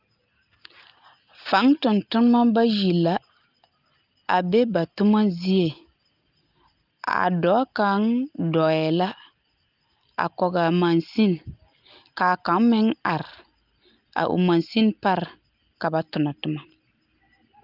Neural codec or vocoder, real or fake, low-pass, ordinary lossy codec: none; real; 5.4 kHz; Opus, 32 kbps